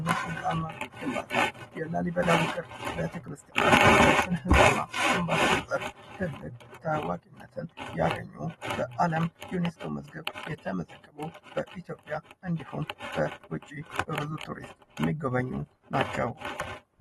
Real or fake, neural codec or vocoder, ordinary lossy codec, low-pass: real; none; AAC, 32 kbps; 19.8 kHz